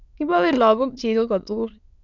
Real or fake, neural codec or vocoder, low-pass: fake; autoencoder, 22.05 kHz, a latent of 192 numbers a frame, VITS, trained on many speakers; 7.2 kHz